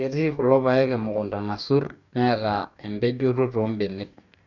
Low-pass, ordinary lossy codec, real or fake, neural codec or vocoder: 7.2 kHz; none; fake; codec, 44.1 kHz, 2.6 kbps, DAC